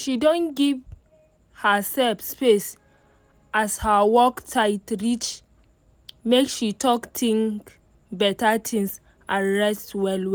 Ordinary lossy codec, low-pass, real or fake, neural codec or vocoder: none; none; real; none